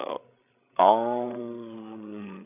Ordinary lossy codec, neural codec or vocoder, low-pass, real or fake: none; codec, 16 kHz, 16 kbps, FreqCodec, larger model; 3.6 kHz; fake